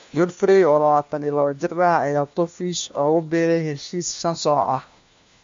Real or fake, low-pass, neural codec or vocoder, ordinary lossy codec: fake; 7.2 kHz; codec, 16 kHz, 1 kbps, FunCodec, trained on LibriTTS, 50 frames a second; MP3, 48 kbps